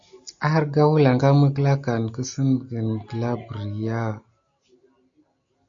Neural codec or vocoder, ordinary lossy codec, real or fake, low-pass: none; AAC, 64 kbps; real; 7.2 kHz